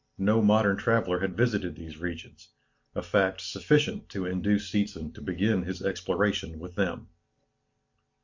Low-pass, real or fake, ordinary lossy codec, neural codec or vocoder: 7.2 kHz; real; MP3, 64 kbps; none